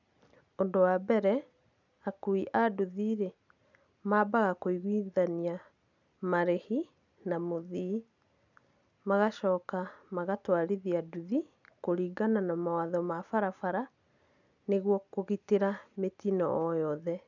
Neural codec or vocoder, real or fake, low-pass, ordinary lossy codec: none; real; 7.2 kHz; none